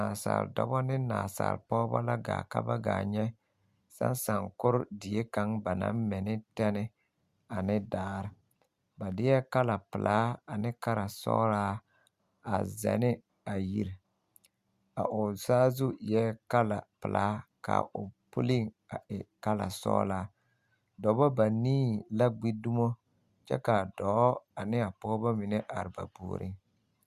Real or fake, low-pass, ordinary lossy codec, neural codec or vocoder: real; 14.4 kHz; Opus, 64 kbps; none